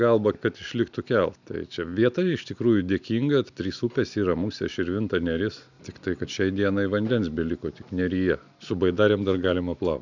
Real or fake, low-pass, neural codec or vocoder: real; 7.2 kHz; none